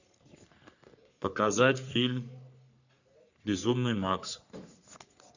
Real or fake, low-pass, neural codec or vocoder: fake; 7.2 kHz; codec, 44.1 kHz, 3.4 kbps, Pupu-Codec